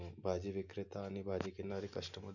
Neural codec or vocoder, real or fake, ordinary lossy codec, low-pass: none; real; none; 7.2 kHz